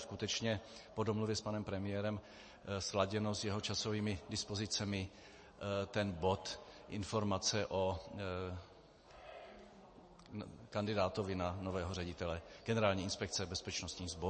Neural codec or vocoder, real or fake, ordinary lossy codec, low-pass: none; real; MP3, 32 kbps; 9.9 kHz